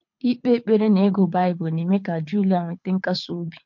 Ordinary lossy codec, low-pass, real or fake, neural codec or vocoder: MP3, 48 kbps; 7.2 kHz; fake; codec, 24 kHz, 6 kbps, HILCodec